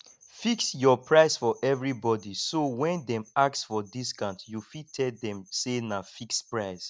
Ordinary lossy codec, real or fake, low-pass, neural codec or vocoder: none; real; none; none